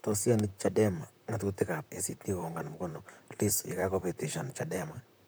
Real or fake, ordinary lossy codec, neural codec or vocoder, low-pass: fake; none; vocoder, 44.1 kHz, 128 mel bands, Pupu-Vocoder; none